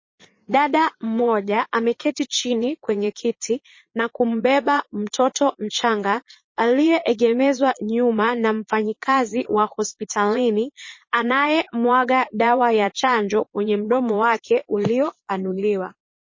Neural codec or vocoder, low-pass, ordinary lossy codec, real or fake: vocoder, 22.05 kHz, 80 mel bands, Vocos; 7.2 kHz; MP3, 32 kbps; fake